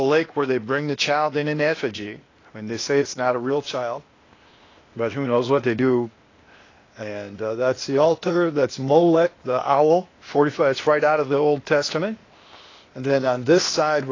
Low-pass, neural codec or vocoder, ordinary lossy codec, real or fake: 7.2 kHz; codec, 16 kHz, 0.8 kbps, ZipCodec; AAC, 32 kbps; fake